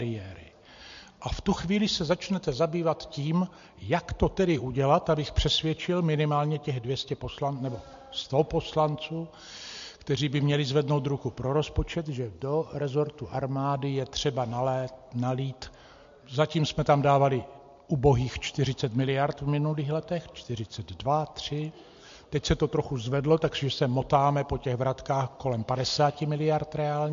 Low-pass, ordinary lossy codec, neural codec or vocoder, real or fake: 7.2 kHz; MP3, 48 kbps; none; real